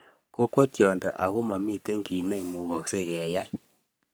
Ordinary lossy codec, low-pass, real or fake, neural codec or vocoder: none; none; fake; codec, 44.1 kHz, 3.4 kbps, Pupu-Codec